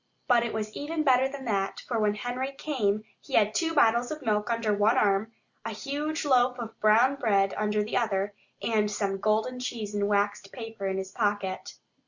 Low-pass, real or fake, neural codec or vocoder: 7.2 kHz; real; none